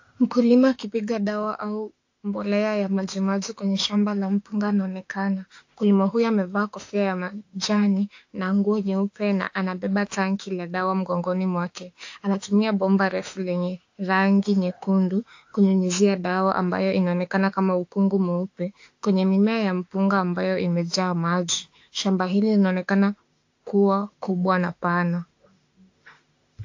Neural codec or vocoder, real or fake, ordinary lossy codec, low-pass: autoencoder, 48 kHz, 32 numbers a frame, DAC-VAE, trained on Japanese speech; fake; AAC, 48 kbps; 7.2 kHz